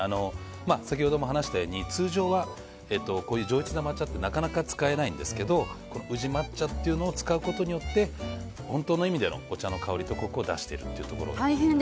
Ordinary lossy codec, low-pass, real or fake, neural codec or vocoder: none; none; real; none